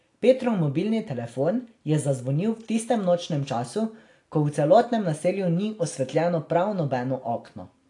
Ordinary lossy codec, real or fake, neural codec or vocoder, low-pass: AAC, 48 kbps; real; none; 10.8 kHz